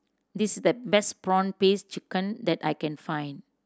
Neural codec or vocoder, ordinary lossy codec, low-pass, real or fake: none; none; none; real